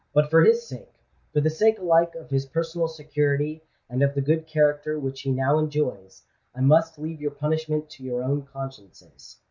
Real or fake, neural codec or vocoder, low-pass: real; none; 7.2 kHz